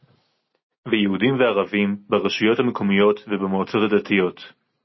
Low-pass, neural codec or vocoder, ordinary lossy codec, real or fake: 7.2 kHz; none; MP3, 24 kbps; real